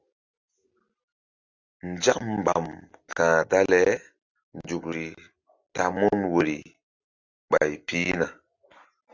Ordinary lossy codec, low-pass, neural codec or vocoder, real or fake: Opus, 64 kbps; 7.2 kHz; none; real